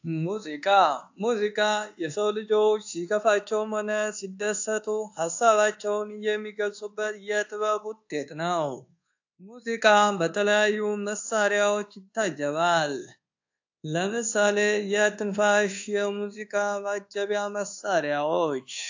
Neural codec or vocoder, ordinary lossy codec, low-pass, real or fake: codec, 24 kHz, 1.2 kbps, DualCodec; AAC, 48 kbps; 7.2 kHz; fake